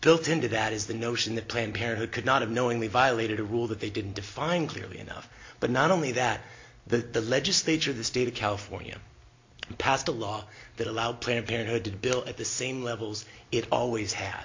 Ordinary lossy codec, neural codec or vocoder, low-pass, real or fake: MP3, 48 kbps; none; 7.2 kHz; real